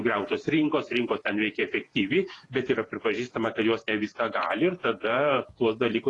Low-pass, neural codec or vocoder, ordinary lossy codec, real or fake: 10.8 kHz; vocoder, 48 kHz, 128 mel bands, Vocos; AAC, 32 kbps; fake